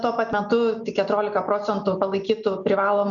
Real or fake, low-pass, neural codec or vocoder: real; 7.2 kHz; none